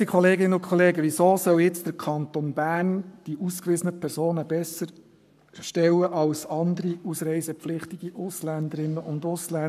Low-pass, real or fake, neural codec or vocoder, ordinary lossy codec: 14.4 kHz; fake; codec, 44.1 kHz, 7.8 kbps, Pupu-Codec; none